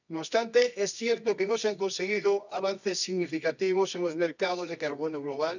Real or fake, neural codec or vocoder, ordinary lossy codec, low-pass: fake; codec, 24 kHz, 0.9 kbps, WavTokenizer, medium music audio release; none; 7.2 kHz